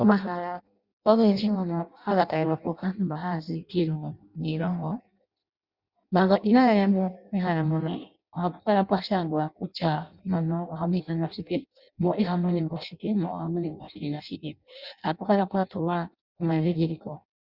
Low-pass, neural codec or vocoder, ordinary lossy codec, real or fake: 5.4 kHz; codec, 16 kHz in and 24 kHz out, 0.6 kbps, FireRedTTS-2 codec; Opus, 64 kbps; fake